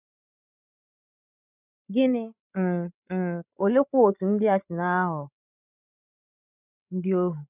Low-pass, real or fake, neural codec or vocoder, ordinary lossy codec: 3.6 kHz; fake; codec, 16 kHz, 16 kbps, FreqCodec, larger model; none